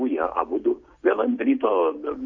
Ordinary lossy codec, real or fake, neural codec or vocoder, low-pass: MP3, 48 kbps; real; none; 7.2 kHz